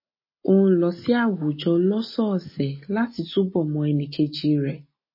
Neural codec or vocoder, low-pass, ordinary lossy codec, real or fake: none; 5.4 kHz; MP3, 24 kbps; real